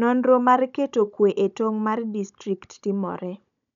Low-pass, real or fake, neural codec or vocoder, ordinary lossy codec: 7.2 kHz; fake; codec, 16 kHz, 16 kbps, FunCodec, trained on Chinese and English, 50 frames a second; none